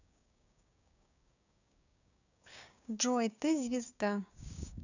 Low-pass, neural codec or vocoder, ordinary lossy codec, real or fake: 7.2 kHz; codec, 16 kHz, 6 kbps, DAC; none; fake